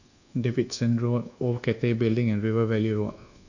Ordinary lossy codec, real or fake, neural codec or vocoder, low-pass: none; fake; codec, 24 kHz, 1.2 kbps, DualCodec; 7.2 kHz